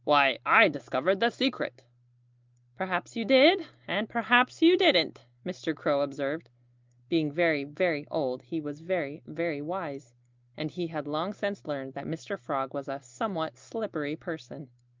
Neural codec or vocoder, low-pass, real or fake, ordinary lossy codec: none; 7.2 kHz; real; Opus, 32 kbps